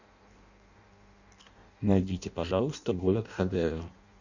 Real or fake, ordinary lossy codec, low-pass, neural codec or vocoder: fake; none; 7.2 kHz; codec, 16 kHz in and 24 kHz out, 0.6 kbps, FireRedTTS-2 codec